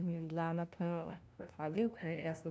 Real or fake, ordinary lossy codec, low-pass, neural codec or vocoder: fake; none; none; codec, 16 kHz, 0.5 kbps, FunCodec, trained on LibriTTS, 25 frames a second